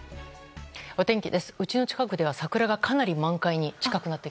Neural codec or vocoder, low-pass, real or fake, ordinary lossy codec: none; none; real; none